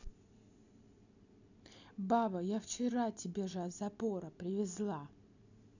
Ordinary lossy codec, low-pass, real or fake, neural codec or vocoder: none; 7.2 kHz; real; none